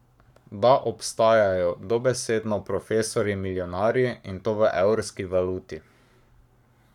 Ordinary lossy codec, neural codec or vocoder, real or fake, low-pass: none; codec, 44.1 kHz, 7.8 kbps, Pupu-Codec; fake; 19.8 kHz